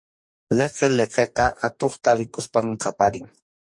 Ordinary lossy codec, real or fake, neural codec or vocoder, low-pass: MP3, 48 kbps; fake; codec, 44.1 kHz, 2.6 kbps, DAC; 10.8 kHz